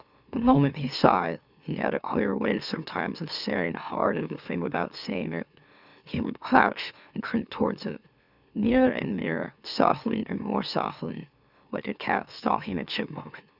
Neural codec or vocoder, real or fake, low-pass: autoencoder, 44.1 kHz, a latent of 192 numbers a frame, MeloTTS; fake; 5.4 kHz